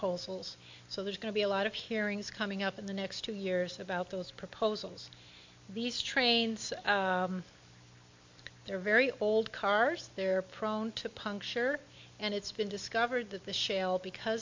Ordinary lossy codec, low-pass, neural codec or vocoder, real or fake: MP3, 48 kbps; 7.2 kHz; none; real